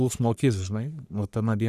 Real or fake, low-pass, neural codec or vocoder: fake; 14.4 kHz; codec, 44.1 kHz, 3.4 kbps, Pupu-Codec